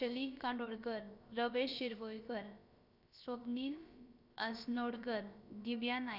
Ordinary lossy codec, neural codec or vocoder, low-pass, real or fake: none; codec, 16 kHz, about 1 kbps, DyCAST, with the encoder's durations; 5.4 kHz; fake